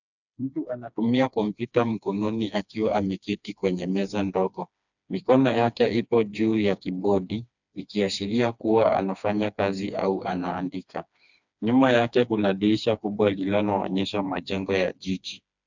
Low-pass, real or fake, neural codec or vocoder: 7.2 kHz; fake; codec, 16 kHz, 2 kbps, FreqCodec, smaller model